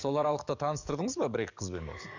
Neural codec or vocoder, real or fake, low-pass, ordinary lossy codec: codec, 44.1 kHz, 7.8 kbps, DAC; fake; 7.2 kHz; none